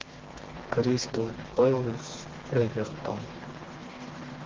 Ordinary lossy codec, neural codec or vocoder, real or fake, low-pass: Opus, 16 kbps; codec, 16 kHz, 2 kbps, FreqCodec, smaller model; fake; 7.2 kHz